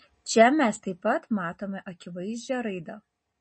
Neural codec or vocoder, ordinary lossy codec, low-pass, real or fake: none; MP3, 32 kbps; 10.8 kHz; real